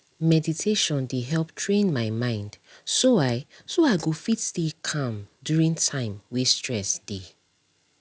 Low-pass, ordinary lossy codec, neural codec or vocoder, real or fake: none; none; none; real